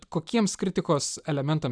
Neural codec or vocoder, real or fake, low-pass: none; real; 9.9 kHz